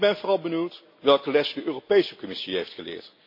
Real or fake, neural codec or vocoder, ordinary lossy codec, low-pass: real; none; MP3, 32 kbps; 5.4 kHz